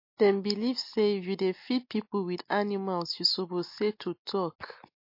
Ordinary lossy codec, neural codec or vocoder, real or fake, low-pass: MP3, 32 kbps; none; real; 5.4 kHz